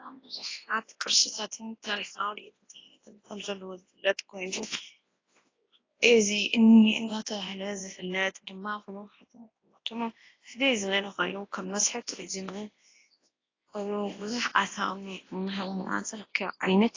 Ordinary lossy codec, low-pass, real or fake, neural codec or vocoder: AAC, 32 kbps; 7.2 kHz; fake; codec, 24 kHz, 0.9 kbps, WavTokenizer, large speech release